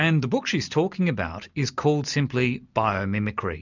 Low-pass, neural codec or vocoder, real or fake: 7.2 kHz; none; real